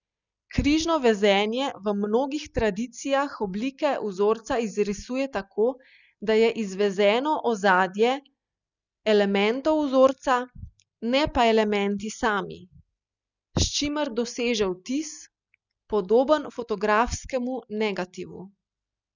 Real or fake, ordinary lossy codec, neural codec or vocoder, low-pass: real; none; none; 7.2 kHz